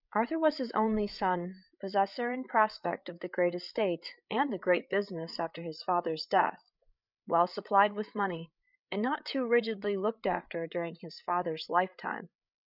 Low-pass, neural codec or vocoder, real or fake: 5.4 kHz; codec, 16 kHz, 8 kbps, FreqCodec, larger model; fake